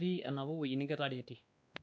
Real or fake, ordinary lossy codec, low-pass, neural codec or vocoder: fake; none; none; codec, 16 kHz, 2 kbps, X-Codec, WavLM features, trained on Multilingual LibriSpeech